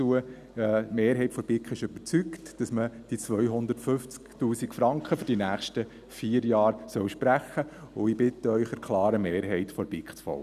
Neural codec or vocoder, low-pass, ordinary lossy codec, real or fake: none; 14.4 kHz; none; real